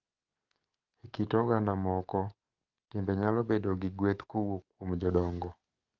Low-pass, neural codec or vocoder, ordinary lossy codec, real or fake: 7.2 kHz; codec, 44.1 kHz, 7.8 kbps, DAC; Opus, 32 kbps; fake